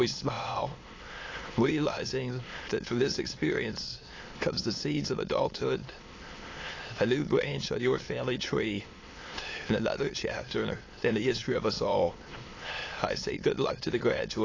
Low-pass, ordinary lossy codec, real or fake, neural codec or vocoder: 7.2 kHz; AAC, 32 kbps; fake; autoencoder, 22.05 kHz, a latent of 192 numbers a frame, VITS, trained on many speakers